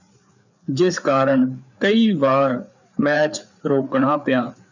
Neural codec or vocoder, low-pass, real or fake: codec, 16 kHz, 4 kbps, FreqCodec, larger model; 7.2 kHz; fake